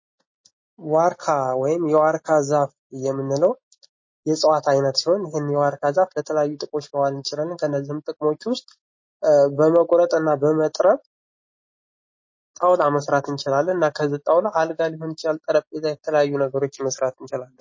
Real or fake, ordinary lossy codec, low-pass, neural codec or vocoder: real; MP3, 32 kbps; 7.2 kHz; none